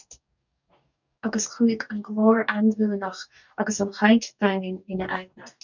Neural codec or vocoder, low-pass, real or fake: codec, 44.1 kHz, 2.6 kbps, DAC; 7.2 kHz; fake